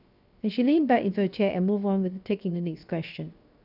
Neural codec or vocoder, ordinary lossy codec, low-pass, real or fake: codec, 16 kHz, 0.7 kbps, FocalCodec; none; 5.4 kHz; fake